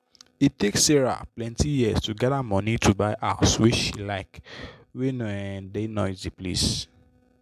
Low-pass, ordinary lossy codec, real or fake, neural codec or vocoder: 14.4 kHz; MP3, 96 kbps; real; none